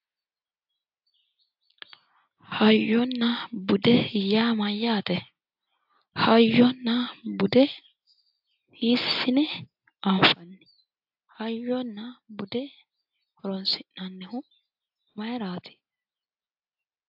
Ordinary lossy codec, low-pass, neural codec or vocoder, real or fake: AAC, 48 kbps; 5.4 kHz; none; real